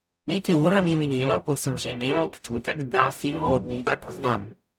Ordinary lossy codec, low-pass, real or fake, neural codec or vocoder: none; 19.8 kHz; fake; codec, 44.1 kHz, 0.9 kbps, DAC